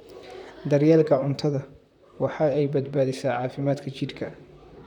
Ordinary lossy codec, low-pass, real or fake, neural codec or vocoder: none; 19.8 kHz; fake; vocoder, 44.1 kHz, 128 mel bands, Pupu-Vocoder